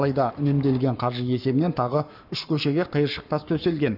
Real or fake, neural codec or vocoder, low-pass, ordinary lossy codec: fake; codec, 44.1 kHz, 7.8 kbps, Pupu-Codec; 5.4 kHz; none